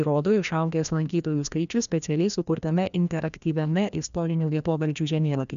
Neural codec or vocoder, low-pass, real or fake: codec, 16 kHz, 1 kbps, FreqCodec, larger model; 7.2 kHz; fake